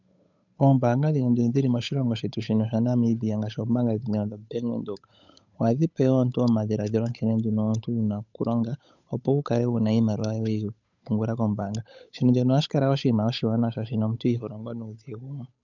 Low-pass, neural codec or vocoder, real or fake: 7.2 kHz; codec, 16 kHz, 8 kbps, FunCodec, trained on Chinese and English, 25 frames a second; fake